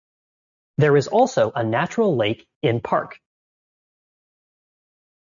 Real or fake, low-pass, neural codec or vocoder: real; 7.2 kHz; none